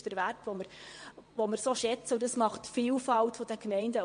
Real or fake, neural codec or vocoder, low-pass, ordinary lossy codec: fake; vocoder, 22.05 kHz, 80 mel bands, WaveNeXt; 9.9 kHz; MP3, 48 kbps